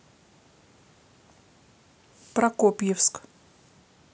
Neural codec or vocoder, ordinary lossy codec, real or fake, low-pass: none; none; real; none